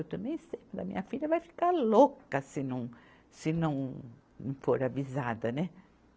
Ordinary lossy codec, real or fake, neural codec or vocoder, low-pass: none; real; none; none